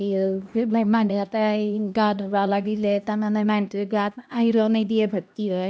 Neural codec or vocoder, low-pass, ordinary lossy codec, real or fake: codec, 16 kHz, 1 kbps, X-Codec, HuBERT features, trained on LibriSpeech; none; none; fake